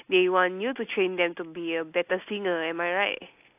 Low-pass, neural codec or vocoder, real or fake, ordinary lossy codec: 3.6 kHz; none; real; AAC, 32 kbps